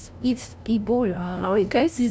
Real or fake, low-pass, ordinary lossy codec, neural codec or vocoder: fake; none; none; codec, 16 kHz, 0.5 kbps, FunCodec, trained on LibriTTS, 25 frames a second